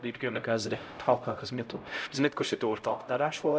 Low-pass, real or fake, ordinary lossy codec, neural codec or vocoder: none; fake; none; codec, 16 kHz, 0.5 kbps, X-Codec, HuBERT features, trained on LibriSpeech